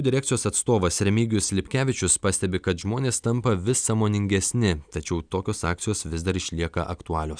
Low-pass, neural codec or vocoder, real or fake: 9.9 kHz; none; real